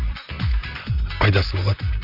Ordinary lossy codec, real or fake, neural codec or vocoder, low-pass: none; fake; vocoder, 44.1 kHz, 128 mel bands, Pupu-Vocoder; 5.4 kHz